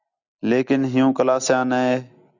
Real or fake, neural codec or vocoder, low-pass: real; none; 7.2 kHz